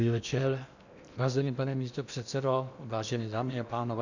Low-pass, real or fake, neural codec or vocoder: 7.2 kHz; fake; codec, 16 kHz in and 24 kHz out, 0.8 kbps, FocalCodec, streaming, 65536 codes